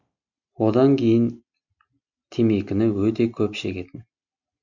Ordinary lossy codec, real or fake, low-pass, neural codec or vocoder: Opus, 64 kbps; real; 7.2 kHz; none